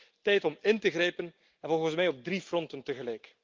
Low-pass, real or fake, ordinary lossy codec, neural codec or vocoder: 7.2 kHz; real; Opus, 32 kbps; none